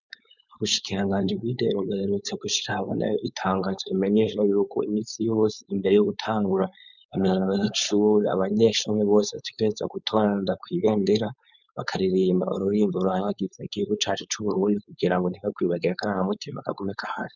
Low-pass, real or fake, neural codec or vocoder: 7.2 kHz; fake; codec, 16 kHz, 4.8 kbps, FACodec